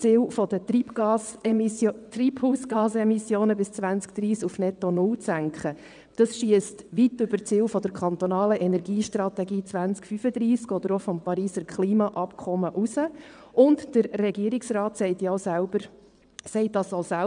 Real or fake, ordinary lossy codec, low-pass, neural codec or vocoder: fake; none; 9.9 kHz; vocoder, 22.05 kHz, 80 mel bands, WaveNeXt